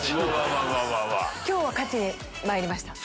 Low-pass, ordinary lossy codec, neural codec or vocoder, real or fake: none; none; none; real